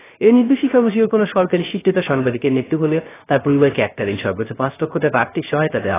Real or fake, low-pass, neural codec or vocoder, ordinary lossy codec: fake; 3.6 kHz; codec, 16 kHz, 0.3 kbps, FocalCodec; AAC, 16 kbps